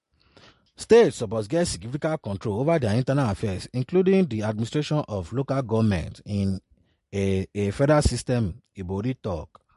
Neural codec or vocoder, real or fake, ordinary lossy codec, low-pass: none; real; MP3, 48 kbps; 14.4 kHz